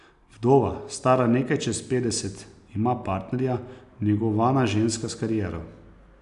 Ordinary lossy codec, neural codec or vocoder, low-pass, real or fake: none; none; 10.8 kHz; real